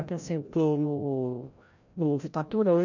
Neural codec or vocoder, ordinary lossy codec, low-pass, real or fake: codec, 16 kHz, 0.5 kbps, FreqCodec, larger model; none; 7.2 kHz; fake